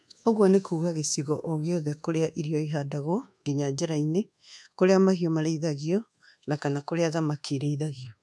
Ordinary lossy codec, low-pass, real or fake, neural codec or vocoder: none; none; fake; codec, 24 kHz, 1.2 kbps, DualCodec